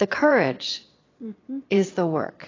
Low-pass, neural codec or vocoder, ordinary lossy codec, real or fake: 7.2 kHz; vocoder, 22.05 kHz, 80 mel bands, Vocos; AAC, 32 kbps; fake